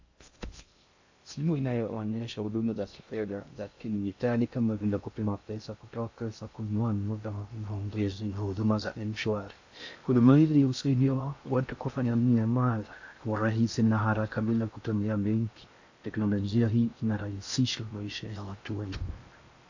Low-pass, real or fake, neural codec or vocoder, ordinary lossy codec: 7.2 kHz; fake; codec, 16 kHz in and 24 kHz out, 0.6 kbps, FocalCodec, streaming, 2048 codes; AAC, 48 kbps